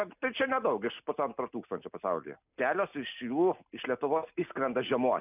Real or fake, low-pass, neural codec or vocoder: real; 3.6 kHz; none